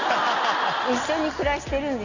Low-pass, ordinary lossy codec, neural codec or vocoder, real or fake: 7.2 kHz; none; none; real